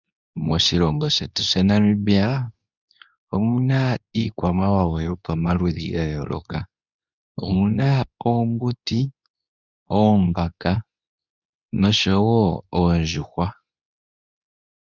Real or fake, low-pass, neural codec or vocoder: fake; 7.2 kHz; codec, 24 kHz, 0.9 kbps, WavTokenizer, medium speech release version 2